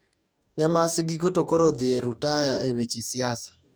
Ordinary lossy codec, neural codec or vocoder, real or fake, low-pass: none; codec, 44.1 kHz, 2.6 kbps, DAC; fake; none